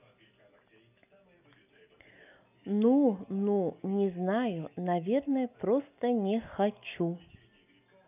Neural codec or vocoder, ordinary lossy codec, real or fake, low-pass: none; none; real; 3.6 kHz